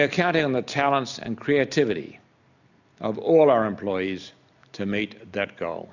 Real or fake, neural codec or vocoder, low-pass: real; none; 7.2 kHz